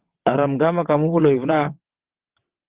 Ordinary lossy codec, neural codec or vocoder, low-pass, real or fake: Opus, 16 kbps; vocoder, 22.05 kHz, 80 mel bands, WaveNeXt; 3.6 kHz; fake